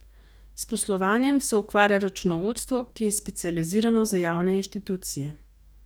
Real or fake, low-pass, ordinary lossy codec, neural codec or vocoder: fake; none; none; codec, 44.1 kHz, 2.6 kbps, DAC